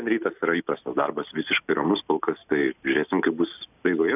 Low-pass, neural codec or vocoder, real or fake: 3.6 kHz; none; real